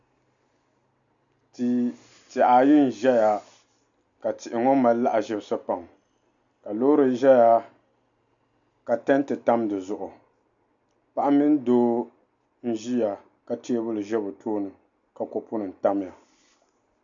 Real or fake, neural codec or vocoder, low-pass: real; none; 7.2 kHz